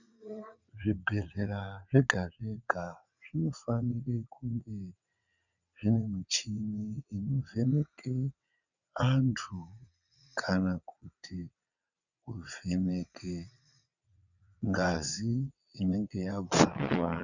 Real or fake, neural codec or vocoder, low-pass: fake; vocoder, 22.05 kHz, 80 mel bands, WaveNeXt; 7.2 kHz